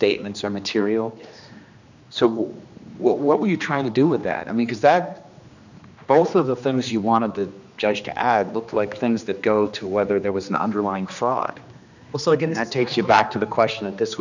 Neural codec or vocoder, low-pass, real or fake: codec, 16 kHz, 2 kbps, X-Codec, HuBERT features, trained on general audio; 7.2 kHz; fake